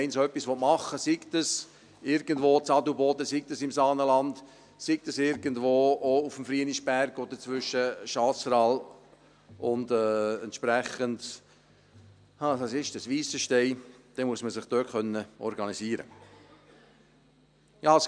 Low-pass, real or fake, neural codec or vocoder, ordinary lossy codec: 9.9 kHz; real; none; none